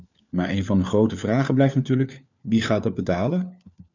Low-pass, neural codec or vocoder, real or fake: 7.2 kHz; codec, 16 kHz, 4 kbps, FunCodec, trained on LibriTTS, 50 frames a second; fake